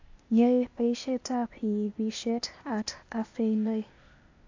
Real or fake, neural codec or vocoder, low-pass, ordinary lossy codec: fake; codec, 16 kHz, 0.8 kbps, ZipCodec; 7.2 kHz; AAC, 48 kbps